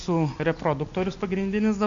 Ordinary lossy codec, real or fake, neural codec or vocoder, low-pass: AAC, 64 kbps; real; none; 7.2 kHz